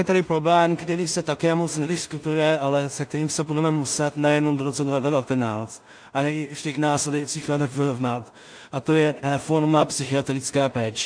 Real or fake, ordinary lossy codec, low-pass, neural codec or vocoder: fake; AAC, 64 kbps; 9.9 kHz; codec, 16 kHz in and 24 kHz out, 0.4 kbps, LongCat-Audio-Codec, two codebook decoder